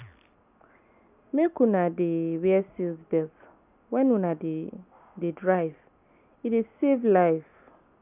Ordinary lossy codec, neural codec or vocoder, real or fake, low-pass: none; none; real; 3.6 kHz